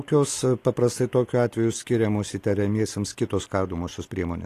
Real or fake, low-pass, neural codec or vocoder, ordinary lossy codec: real; 14.4 kHz; none; AAC, 48 kbps